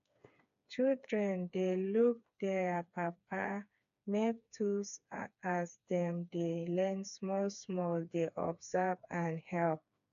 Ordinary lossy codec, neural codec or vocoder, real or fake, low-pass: MP3, 96 kbps; codec, 16 kHz, 4 kbps, FreqCodec, smaller model; fake; 7.2 kHz